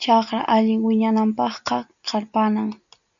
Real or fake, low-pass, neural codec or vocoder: real; 7.2 kHz; none